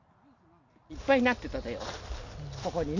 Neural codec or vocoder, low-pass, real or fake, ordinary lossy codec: none; 7.2 kHz; real; none